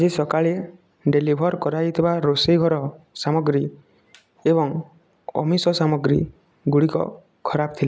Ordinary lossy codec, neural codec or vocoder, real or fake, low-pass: none; none; real; none